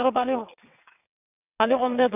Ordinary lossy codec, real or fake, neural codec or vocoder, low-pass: none; fake; vocoder, 22.05 kHz, 80 mel bands, WaveNeXt; 3.6 kHz